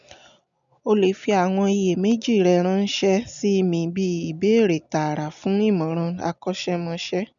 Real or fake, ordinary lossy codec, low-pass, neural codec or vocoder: real; MP3, 96 kbps; 7.2 kHz; none